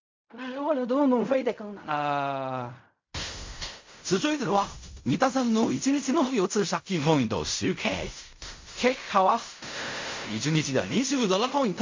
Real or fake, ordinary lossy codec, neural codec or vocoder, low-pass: fake; MP3, 48 kbps; codec, 16 kHz in and 24 kHz out, 0.4 kbps, LongCat-Audio-Codec, fine tuned four codebook decoder; 7.2 kHz